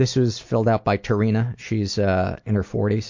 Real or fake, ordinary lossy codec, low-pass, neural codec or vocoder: real; MP3, 48 kbps; 7.2 kHz; none